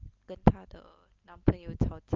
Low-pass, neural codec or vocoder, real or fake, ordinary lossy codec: 7.2 kHz; none; real; Opus, 24 kbps